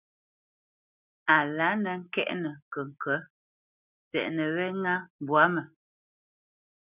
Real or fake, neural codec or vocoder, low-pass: real; none; 3.6 kHz